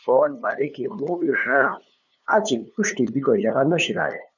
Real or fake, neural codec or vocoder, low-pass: fake; codec, 16 kHz, 2 kbps, FunCodec, trained on LibriTTS, 25 frames a second; 7.2 kHz